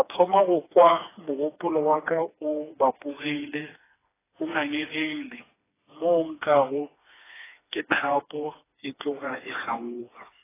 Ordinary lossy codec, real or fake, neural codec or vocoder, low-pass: AAC, 16 kbps; fake; codec, 16 kHz, 2 kbps, FreqCodec, smaller model; 3.6 kHz